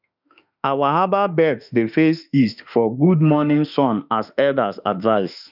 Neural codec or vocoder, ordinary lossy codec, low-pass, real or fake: autoencoder, 48 kHz, 32 numbers a frame, DAC-VAE, trained on Japanese speech; none; 5.4 kHz; fake